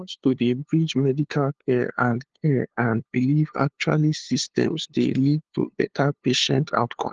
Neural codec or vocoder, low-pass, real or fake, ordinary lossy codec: codec, 16 kHz, 2 kbps, FunCodec, trained on LibriTTS, 25 frames a second; 7.2 kHz; fake; Opus, 16 kbps